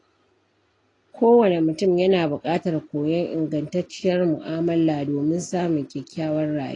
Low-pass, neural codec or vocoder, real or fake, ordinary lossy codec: 10.8 kHz; none; real; AAC, 32 kbps